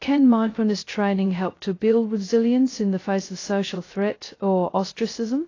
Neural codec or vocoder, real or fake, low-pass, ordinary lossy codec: codec, 16 kHz, 0.2 kbps, FocalCodec; fake; 7.2 kHz; AAC, 32 kbps